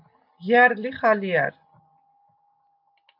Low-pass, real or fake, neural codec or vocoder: 5.4 kHz; real; none